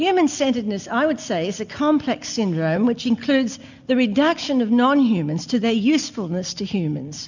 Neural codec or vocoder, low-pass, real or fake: none; 7.2 kHz; real